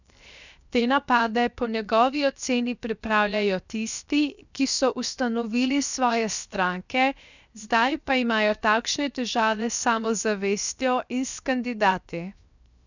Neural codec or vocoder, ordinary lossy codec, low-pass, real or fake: codec, 16 kHz, 0.7 kbps, FocalCodec; none; 7.2 kHz; fake